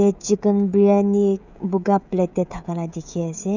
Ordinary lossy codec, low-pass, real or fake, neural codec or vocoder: none; 7.2 kHz; fake; codec, 16 kHz, 16 kbps, FreqCodec, smaller model